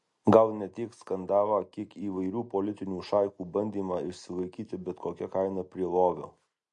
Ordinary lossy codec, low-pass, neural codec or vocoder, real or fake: MP3, 48 kbps; 10.8 kHz; none; real